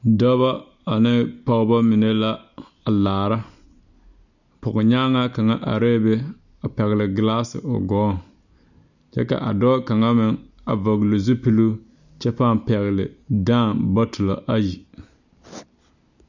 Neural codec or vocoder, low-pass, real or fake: none; 7.2 kHz; real